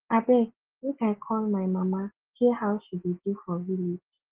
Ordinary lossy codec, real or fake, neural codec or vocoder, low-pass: Opus, 16 kbps; real; none; 3.6 kHz